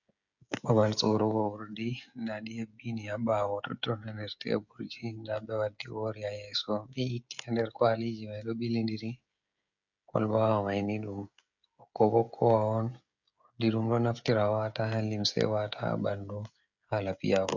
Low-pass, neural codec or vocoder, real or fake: 7.2 kHz; codec, 16 kHz, 16 kbps, FreqCodec, smaller model; fake